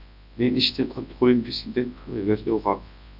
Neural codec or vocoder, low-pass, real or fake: codec, 24 kHz, 0.9 kbps, WavTokenizer, large speech release; 5.4 kHz; fake